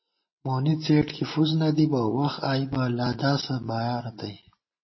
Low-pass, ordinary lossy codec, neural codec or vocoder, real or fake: 7.2 kHz; MP3, 24 kbps; none; real